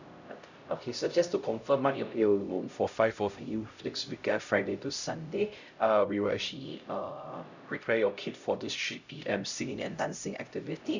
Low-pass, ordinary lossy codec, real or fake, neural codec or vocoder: 7.2 kHz; none; fake; codec, 16 kHz, 0.5 kbps, X-Codec, HuBERT features, trained on LibriSpeech